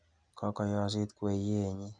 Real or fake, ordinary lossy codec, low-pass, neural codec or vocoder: real; none; 9.9 kHz; none